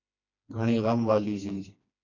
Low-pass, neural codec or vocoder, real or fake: 7.2 kHz; codec, 16 kHz, 2 kbps, FreqCodec, smaller model; fake